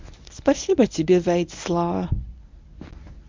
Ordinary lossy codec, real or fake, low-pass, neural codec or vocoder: MP3, 64 kbps; fake; 7.2 kHz; codec, 24 kHz, 0.9 kbps, WavTokenizer, medium speech release version 1